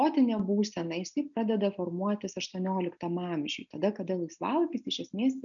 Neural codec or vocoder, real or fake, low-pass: none; real; 7.2 kHz